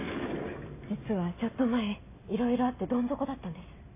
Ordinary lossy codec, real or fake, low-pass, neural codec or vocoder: AAC, 24 kbps; real; 3.6 kHz; none